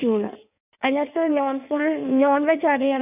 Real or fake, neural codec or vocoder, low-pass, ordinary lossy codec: fake; codec, 16 kHz in and 24 kHz out, 1.1 kbps, FireRedTTS-2 codec; 3.6 kHz; none